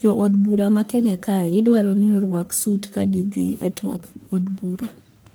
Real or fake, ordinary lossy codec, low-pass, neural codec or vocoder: fake; none; none; codec, 44.1 kHz, 1.7 kbps, Pupu-Codec